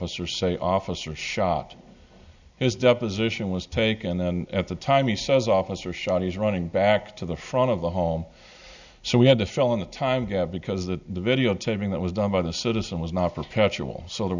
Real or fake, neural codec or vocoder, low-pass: real; none; 7.2 kHz